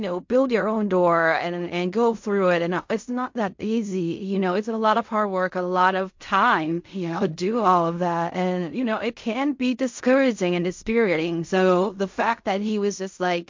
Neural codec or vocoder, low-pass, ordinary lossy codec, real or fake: codec, 16 kHz in and 24 kHz out, 0.4 kbps, LongCat-Audio-Codec, fine tuned four codebook decoder; 7.2 kHz; MP3, 48 kbps; fake